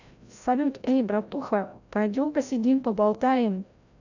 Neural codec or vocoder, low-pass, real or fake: codec, 16 kHz, 0.5 kbps, FreqCodec, larger model; 7.2 kHz; fake